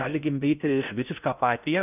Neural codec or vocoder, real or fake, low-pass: codec, 16 kHz in and 24 kHz out, 0.6 kbps, FocalCodec, streaming, 4096 codes; fake; 3.6 kHz